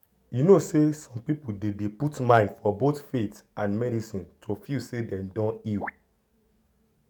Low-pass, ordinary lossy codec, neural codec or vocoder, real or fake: 19.8 kHz; MP3, 96 kbps; codec, 44.1 kHz, 7.8 kbps, DAC; fake